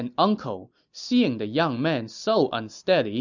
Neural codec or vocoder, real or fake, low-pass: none; real; 7.2 kHz